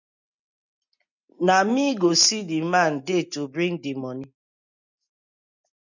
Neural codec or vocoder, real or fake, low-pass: none; real; 7.2 kHz